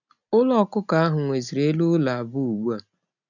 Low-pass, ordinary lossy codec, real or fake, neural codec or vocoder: 7.2 kHz; none; real; none